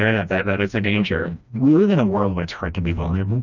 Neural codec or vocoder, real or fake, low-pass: codec, 16 kHz, 1 kbps, FreqCodec, smaller model; fake; 7.2 kHz